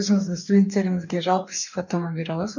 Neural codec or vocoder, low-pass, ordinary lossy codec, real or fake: codec, 44.1 kHz, 2.6 kbps, DAC; 7.2 kHz; none; fake